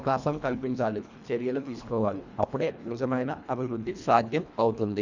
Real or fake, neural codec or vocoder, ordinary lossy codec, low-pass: fake; codec, 24 kHz, 1.5 kbps, HILCodec; none; 7.2 kHz